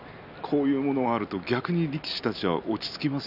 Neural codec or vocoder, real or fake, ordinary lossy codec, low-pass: none; real; none; 5.4 kHz